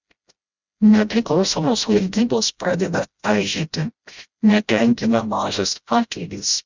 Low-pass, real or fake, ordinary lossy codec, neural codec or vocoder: 7.2 kHz; fake; Opus, 64 kbps; codec, 16 kHz, 0.5 kbps, FreqCodec, smaller model